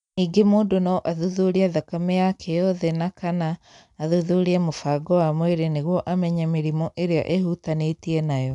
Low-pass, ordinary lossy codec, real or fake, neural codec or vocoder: 10.8 kHz; none; real; none